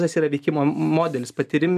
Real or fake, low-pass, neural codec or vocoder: real; 14.4 kHz; none